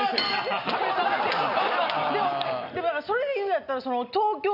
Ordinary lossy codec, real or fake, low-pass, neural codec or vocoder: none; real; 5.4 kHz; none